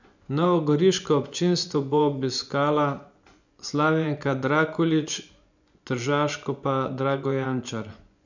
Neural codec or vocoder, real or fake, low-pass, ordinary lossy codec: vocoder, 24 kHz, 100 mel bands, Vocos; fake; 7.2 kHz; none